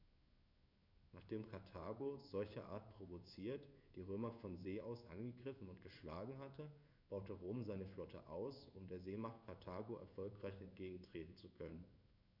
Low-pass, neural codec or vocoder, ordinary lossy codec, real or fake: 5.4 kHz; codec, 16 kHz in and 24 kHz out, 1 kbps, XY-Tokenizer; none; fake